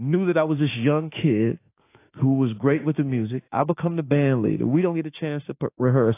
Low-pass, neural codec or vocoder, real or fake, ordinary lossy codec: 3.6 kHz; autoencoder, 48 kHz, 32 numbers a frame, DAC-VAE, trained on Japanese speech; fake; AAC, 24 kbps